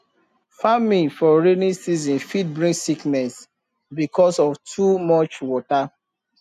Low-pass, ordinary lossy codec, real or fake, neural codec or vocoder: 14.4 kHz; none; real; none